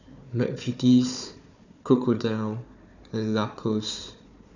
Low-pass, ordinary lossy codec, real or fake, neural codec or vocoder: 7.2 kHz; none; fake; codec, 16 kHz, 4 kbps, FunCodec, trained on Chinese and English, 50 frames a second